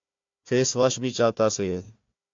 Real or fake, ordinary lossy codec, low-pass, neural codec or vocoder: fake; AAC, 48 kbps; 7.2 kHz; codec, 16 kHz, 1 kbps, FunCodec, trained on Chinese and English, 50 frames a second